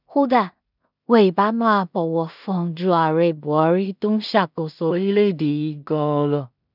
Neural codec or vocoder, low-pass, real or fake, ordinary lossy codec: codec, 16 kHz in and 24 kHz out, 0.4 kbps, LongCat-Audio-Codec, two codebook decoder; 5.4 kHz; fake; none